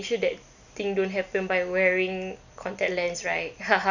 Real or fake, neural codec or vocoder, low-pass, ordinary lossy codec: real; none; 7.2 kHz; none